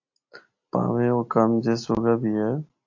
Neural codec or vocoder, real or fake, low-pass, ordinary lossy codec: none; real; 7.2 kHz; AAC, 48 kbps